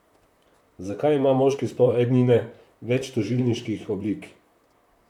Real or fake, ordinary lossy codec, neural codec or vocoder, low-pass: fake; none; vocoder, 44.1 kHz, 128 mel bands, Pupu-Vocoder; 19.8 kHz